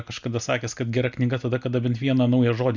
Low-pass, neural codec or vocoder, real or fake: 7.2 kHz; none; real